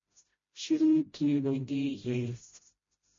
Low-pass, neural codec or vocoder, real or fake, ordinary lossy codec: 7.2 kHz; codec, 16 kHz, 0.5 kbps, FreqCodec, smaller model; fake; MP3, 32 kbps